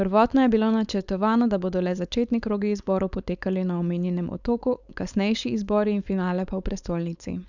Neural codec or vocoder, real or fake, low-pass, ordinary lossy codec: codec, 16 kHz, 4.8 kbps, FACodec; fake; 7.2 kHz; none